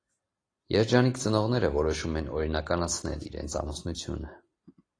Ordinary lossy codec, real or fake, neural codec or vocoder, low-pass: AAC, 32 kbps; real; none; 9.9 kHz